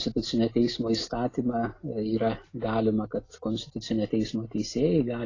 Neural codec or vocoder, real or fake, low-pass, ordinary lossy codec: none; real; 7.2 kHz; AAC, 32 kbps